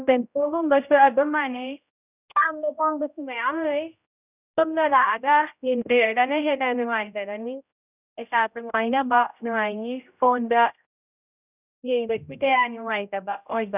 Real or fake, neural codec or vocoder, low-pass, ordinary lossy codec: fake; codec, 16 kHz, 0.5 kbps, X-Codec, HuBERT features, trained on general audio; 3.6 kHz; none